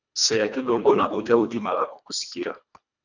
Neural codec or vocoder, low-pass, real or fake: codec, 24 kHz, 1.5 kbps, HILCodec; 7.2 kHz; fake